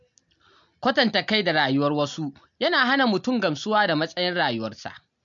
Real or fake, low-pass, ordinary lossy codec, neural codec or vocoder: real; 7.2 kHz; MP3, 64 kbps; none